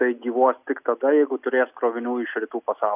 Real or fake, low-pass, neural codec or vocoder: real; 3.6 kHz; none